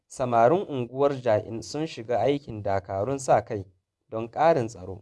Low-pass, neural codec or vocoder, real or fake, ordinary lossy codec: none; vocoder, 24 kHz, 100 mel bands, Vocos; fake; none